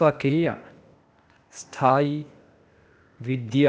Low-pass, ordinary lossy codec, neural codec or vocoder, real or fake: none; none; codec, 16 kHz, 0.8 kbps, ZipCodec; fake